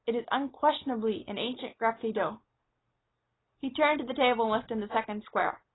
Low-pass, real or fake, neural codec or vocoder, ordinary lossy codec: 7.2 kHz; real; none; AAC, 16 kbps